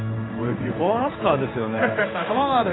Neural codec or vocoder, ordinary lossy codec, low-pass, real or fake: codec, 16 kHz in and 24 kHz out, 1 kbps, XY-Tokenizer; AAC, 16 kbps; 7.2 kHz; fake